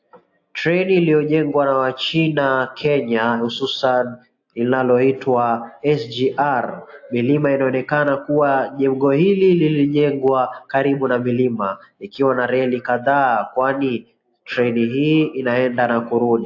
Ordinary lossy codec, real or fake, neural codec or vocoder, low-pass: AAC, 48 kbps; real; none; 7.2 kHz